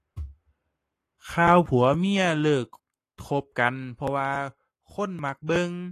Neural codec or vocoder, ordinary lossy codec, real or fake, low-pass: codec, 44.1 kHz, 7.8 kbps, Pupu-Codec; AAC, 48 kbps; fake; 14.4 kHz